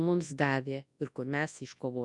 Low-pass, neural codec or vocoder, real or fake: 10.8 kHz; codec, 24 kHz, 0.9 kbps, WavTokenizer, large speech release; fake